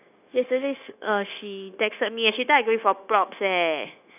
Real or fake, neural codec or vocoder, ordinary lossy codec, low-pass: fake; codec, 16 kHz, 0.9 kbps, LongCat-Audio-Codec; none; 3.6 kHz